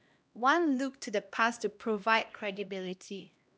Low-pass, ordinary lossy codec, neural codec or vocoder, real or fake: none; none; codec, 16 kHz, 1 kbps, X-Codec, HuBERT features, trained on LibriSpeech; fake